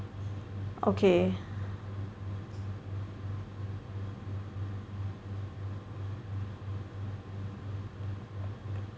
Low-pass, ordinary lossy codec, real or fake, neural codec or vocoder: none; none; real; none